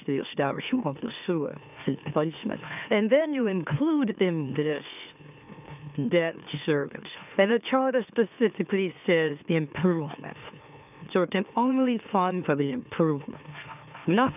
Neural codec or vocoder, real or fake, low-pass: autoencoder, 44.1 kHz, a latent of 192 numbers a frame, MeloTTS; fake; 3.6 kHz